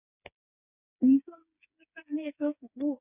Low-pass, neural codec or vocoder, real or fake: 3.6 kHz; codec, 16 kHz, 4 kbps, FreqCodec, smaller model; fake